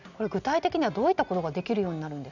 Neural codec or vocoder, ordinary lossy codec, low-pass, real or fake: none; none; 7.2 kHz; real